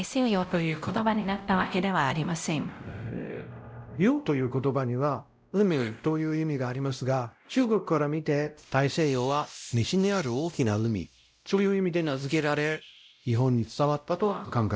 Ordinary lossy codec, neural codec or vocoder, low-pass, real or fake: none; codec, 16 kHz, 0.5 kbps, X-Codec, WavLM features, trained on Multilingual LibriSpeech; none; fake